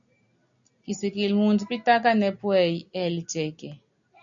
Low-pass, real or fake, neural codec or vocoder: 7.2 kHz; real; none